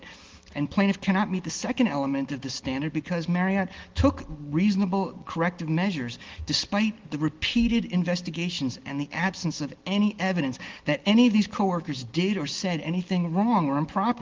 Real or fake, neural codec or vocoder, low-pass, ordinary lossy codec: real; none; 7.2 kHz; Opus, 16 kbps